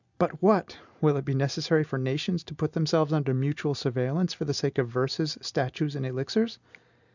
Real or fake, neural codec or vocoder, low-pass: real; none; 7.2 kHz